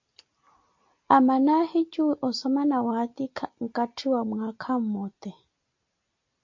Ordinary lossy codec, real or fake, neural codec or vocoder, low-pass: MP3, 48 kbps; real; none; 7.2 kHz